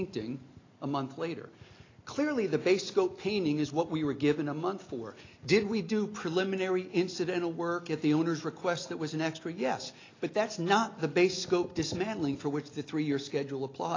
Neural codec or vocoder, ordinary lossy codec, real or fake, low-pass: none; AAC, 32 kbps; real; 7.2 kHz